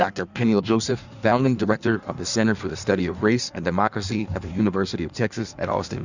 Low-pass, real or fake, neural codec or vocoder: 7.2 kHz; fake; codec, 16 kHz in and 24 kHz out, 1.1 kbps, FireRedTTS-2 codec